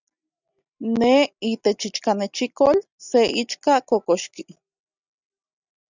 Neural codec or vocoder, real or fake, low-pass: none; real; 7.2 kHz